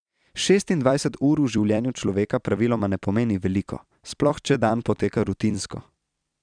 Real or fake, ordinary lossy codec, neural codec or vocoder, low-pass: fake; none; vocoder, 44.1 kHz, 128 mel bands every 256 samples, BigVGAN v2; 9.9 kHz